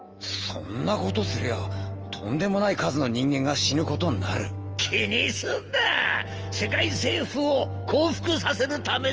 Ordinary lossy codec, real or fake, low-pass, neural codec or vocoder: Opus, 24 kbps; fake; 7.2 kHz; autoencoder, 48 kHz, 128 numbers a frame, DAC-VAE, trained on Japanese speech